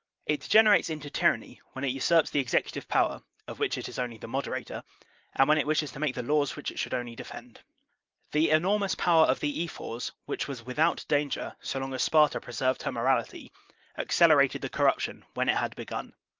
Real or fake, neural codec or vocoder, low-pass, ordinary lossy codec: real; none; 7.2 kHz; Opus, 24 kbps